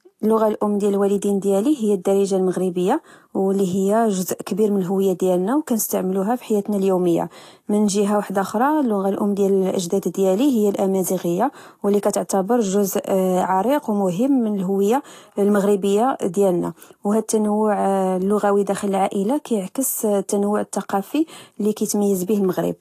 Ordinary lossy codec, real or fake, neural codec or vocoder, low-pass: AAC, 48 kbps; real; none; 14.4 kHz